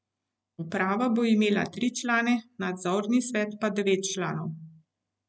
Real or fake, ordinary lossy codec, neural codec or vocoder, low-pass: real; none; none; none